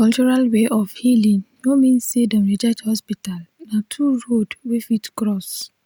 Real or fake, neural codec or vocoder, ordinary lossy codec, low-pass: real; none; none; none